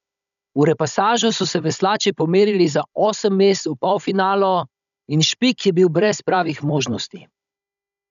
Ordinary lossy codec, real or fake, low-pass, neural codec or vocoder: none; fake; 7.2 kHz; codec, 16 kHz, 16 kbps, FunCodec, trained on Chinese and English, 50 frames a second